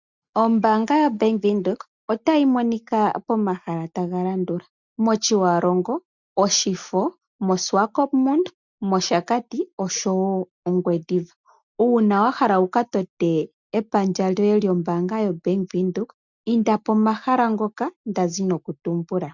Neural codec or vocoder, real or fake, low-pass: none; real; 7.2 kHz